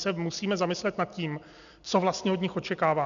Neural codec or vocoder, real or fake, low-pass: none; real; 7.2 kHz